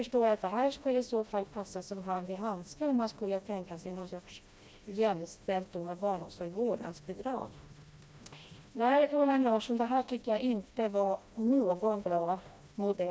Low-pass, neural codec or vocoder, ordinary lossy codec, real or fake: none; codec, 16 kHz, 1 kbps, FreqCodec, smaller model; none; fake